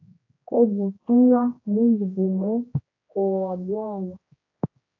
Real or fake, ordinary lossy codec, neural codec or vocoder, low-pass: fake; AAC, 32 kbps; codec, 16 kHz, 1 kbps, X-Codec, HuBERT features, trained on general audio; 7.2 kHz